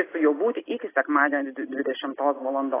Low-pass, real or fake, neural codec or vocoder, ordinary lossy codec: 3.6 kHz; real; none; AAC, 16 kbps